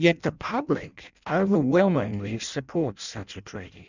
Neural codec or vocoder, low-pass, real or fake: codec, 16 kHz in and 24 kHz out, 0.6 kbps, FireRedTTS-2 codec; 7.2 kHz; fake